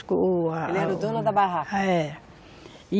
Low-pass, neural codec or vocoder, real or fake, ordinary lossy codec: none; none; real; none